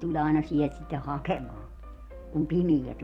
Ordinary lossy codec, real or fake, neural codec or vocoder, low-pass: Opus, 64 kbps; fake; vocoder, 44.1 kHz, 128 mel bands every 256 samples, BigVGAN v2; 19.8 kHz